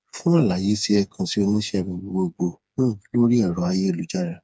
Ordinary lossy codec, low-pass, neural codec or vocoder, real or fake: none; none; codec, 16 kHz, 4 kbps, FreqCodec, smaller model; fake